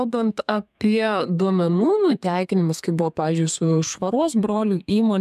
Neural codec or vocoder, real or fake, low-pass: codec, 32 kHz, 1.9 kbps, SNAC; fake; 14.4 kHz